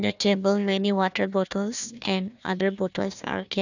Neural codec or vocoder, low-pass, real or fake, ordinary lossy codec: codec, 16 kHz, 1 kbps, FunCodec, trained on Chinese and English, 50 frames a second; 7.2 kHz; fake; none